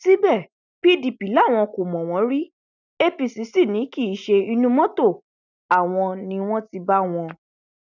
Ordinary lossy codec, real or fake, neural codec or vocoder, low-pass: none; real; none; 7.2 kHz